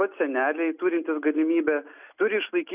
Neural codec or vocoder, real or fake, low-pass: none; real; 3.6 kHz